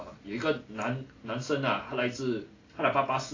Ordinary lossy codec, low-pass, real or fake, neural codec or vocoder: AAC, 32 kbps; 7.2 kHz; real; none